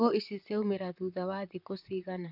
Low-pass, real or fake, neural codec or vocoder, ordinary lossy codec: 5.4 kHz; fake; vocoder, 44.1 kHz, 128 mel bands, Pupu-Vocoder; none